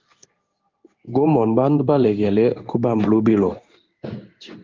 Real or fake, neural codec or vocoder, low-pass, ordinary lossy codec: fake; codec, 16 kHz in and 24 kHz out, 1 kbps, XY-Tokenizer; 7.2 kHz; Opus, 24 kbps